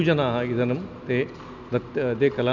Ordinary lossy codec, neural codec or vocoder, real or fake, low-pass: none; none; real; 7.2 kHz